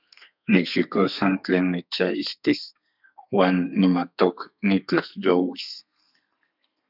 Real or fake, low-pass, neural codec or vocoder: fake; 5.4 kHz; codec, 32 kHz, 1.9 kbps, SNAC